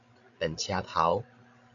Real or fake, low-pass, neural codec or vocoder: fake; 7.2 kHz; codec, 16 kHz, 16 kbps, FreqCodec, larger model